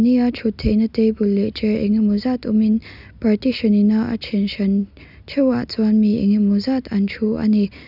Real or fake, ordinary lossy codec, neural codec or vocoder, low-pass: real; none; none; 5.4 kHz